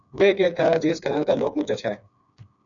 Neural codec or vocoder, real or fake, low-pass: codec, 16 kHz, 4 kbps, FreqCodec, smaller model; fake; 7.2 kHz